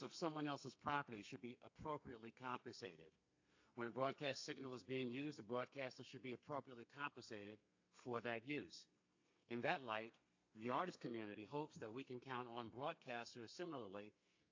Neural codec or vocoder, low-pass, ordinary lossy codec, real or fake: codec, 32 kHz, 1.9 kbps, SNAC; 7.2 kHz; AAC, 48 kbps; fake